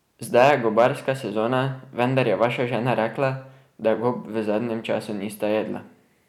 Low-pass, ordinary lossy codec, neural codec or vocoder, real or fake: 19.8 kHz; none; none; real